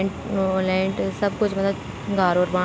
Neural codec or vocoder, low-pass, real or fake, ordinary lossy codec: none; none; real; none